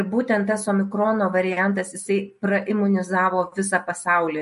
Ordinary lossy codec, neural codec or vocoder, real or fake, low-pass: MP3, 48 kbps; none; real; 14.4 kHz